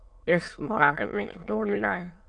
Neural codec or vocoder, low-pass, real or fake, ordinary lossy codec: autoencoder, 22.05 kHz, a latent of 192 numbers a frame, VITS, trained on many speakers; 9.9 kHz; fake; MP3, 64 kbps